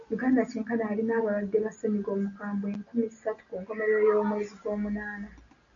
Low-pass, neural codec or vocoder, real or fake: 7.2 kHz; none; real